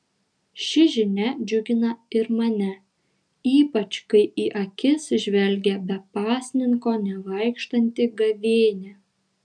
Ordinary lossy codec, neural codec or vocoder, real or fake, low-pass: MP3, 96 kbps; none; real; 9.9 kHz